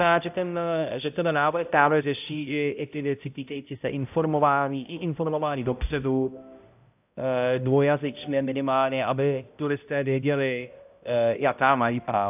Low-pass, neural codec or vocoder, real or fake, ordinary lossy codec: 3.6 kHz; codec, 16 kHz, 0.5 kbps, X-Codec, HuBERT features, trained on balanced general audio; fake; AAC, 32 kbps